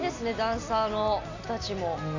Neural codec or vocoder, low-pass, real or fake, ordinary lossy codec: none; 7.2 kHz; real; AAC, 48 kbps